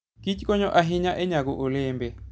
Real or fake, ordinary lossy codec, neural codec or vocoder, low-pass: real; none; none; none